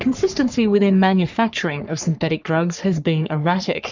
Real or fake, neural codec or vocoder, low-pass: fake; codec, 44.1 kHz, 3.4 kbps, Pupu-Codec; 7.2 kHz